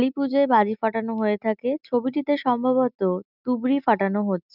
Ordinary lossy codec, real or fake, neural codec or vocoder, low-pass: none; real; none; 5.4 kHz